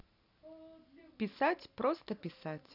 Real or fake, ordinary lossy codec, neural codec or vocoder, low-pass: real; none; none; 5.4 kHz